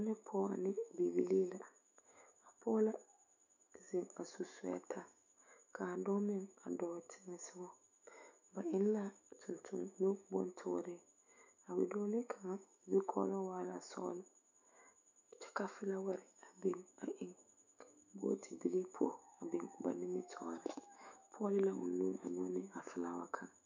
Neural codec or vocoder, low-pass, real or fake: autoencoder, 48 kHz, 128 numbers a frame, DAC-VAE, trained on Japanese speech; 7.2 kHz; fake